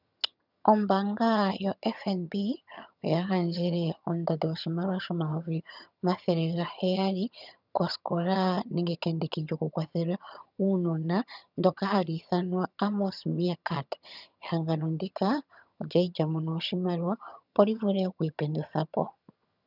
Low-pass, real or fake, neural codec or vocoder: 5.4 kHz; fake; vocoder, 22.05 kHz, 80 mel bands, HiFi-GAN